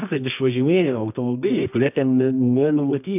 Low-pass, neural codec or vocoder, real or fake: 3.6 kHz; codec, 24 kHz, 0.9 kbps, WavTokenizer, medium music audio release; fake